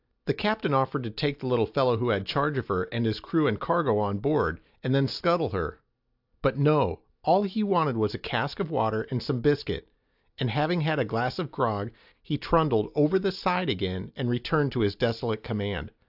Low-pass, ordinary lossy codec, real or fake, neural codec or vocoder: 5.4 kHz; AAC, 48 kbps; real; none